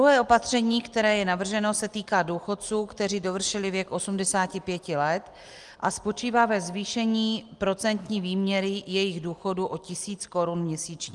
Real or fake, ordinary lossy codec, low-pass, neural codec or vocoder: real; Opus, 24 kbps; 10.8 kHz; none